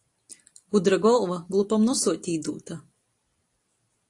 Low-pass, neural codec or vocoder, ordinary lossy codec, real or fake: 10.8 kHz; none; AAC, 48 kbps; real